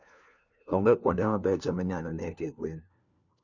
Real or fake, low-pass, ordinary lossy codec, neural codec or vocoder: fake; 7.2 kHz; none; codec, 16 kHz, 1 kbps, FunCodec, trained on LibriTTS, 50 frames a second